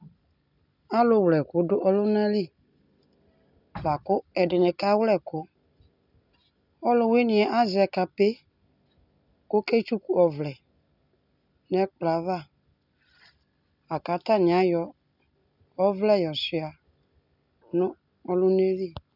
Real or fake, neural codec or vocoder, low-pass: real; none; 5.4 kHz